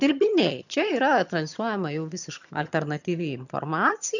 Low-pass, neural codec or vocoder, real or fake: 7.2 kHz; vocoder, 22.05 kHz, 80 mel bands, HiFi-GAN; fake